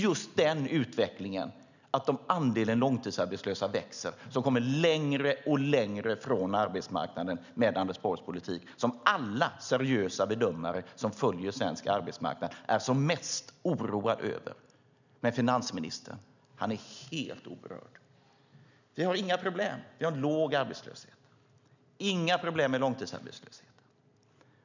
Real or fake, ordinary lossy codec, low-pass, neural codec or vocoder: real; none; 7.2 kHz; none